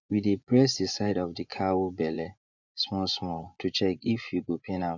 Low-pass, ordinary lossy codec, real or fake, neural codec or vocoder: 7.2 kHz; none; fake; vocoder, 24 kHz, 100 mel bands, Vocos